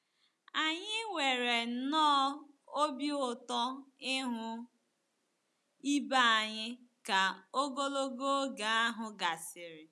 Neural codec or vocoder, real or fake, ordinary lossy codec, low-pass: none; real; none; none